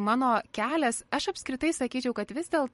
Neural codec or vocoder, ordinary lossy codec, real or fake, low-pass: vocoder, 44.1 kHz, 128 mel bands every 512 samples, BigVGAN v2; MP3, 48 kbps; fake; 19.8 kHz